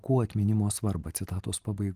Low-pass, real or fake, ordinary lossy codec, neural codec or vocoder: 14.4 kHz; fake; Opus, 24 kbps; vocoder, 44.1 kHz, 128 mel bands every 512 samples, BigVGAN v2